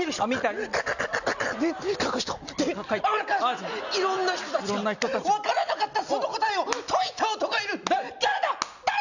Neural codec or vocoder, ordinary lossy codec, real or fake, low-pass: none; none; real; 7.2 kHz